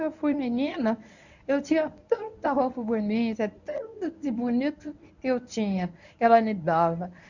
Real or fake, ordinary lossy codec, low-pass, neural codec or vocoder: fake; none; 7.2 kHz; codec, 24 kHz, 0.9 kbps, WavTokenizer, medium speech release version 1